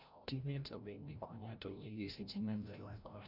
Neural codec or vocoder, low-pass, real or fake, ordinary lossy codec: codec, 16 kHz, 0.5 kbps, FreqCodec, larger model; 5.4 kHz; fake; none